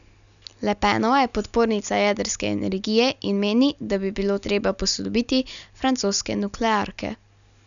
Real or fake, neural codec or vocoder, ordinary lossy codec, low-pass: real; none; none; 7.2 kHz